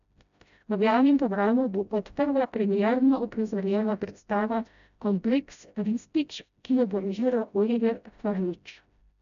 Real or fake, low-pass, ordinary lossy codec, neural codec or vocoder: fake; 7.2 kHz; none; codec, 16 kHz, 0.5 kbps, FreqCodec, smaller model